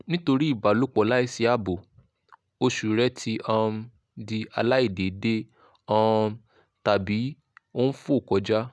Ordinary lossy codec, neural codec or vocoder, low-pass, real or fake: none; none; none; real